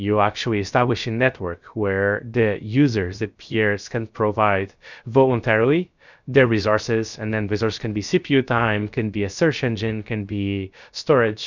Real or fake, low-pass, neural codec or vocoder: fake; 7.2 kHz; codec, 16 kHz, 0.3 kbps, FocalCodec